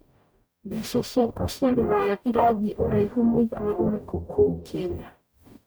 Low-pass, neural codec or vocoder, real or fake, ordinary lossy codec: none; codec, 44.1 kHz, 0.9 kbps, DAC; fake; none